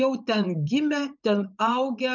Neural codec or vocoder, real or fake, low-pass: vocoder, 44.1 kHz, 80 mel bands, Vocos; fake; 7.2 kHz